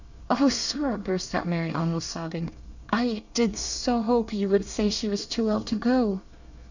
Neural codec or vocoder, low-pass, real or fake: codec, 24 kHz, 1 kbps, SNAC; 7.2 kHz; fake